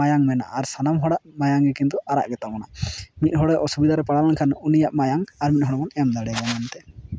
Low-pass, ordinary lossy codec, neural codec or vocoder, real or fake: none; none; none; real